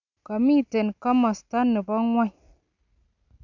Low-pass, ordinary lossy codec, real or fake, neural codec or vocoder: 7.2 kHz; none; real; none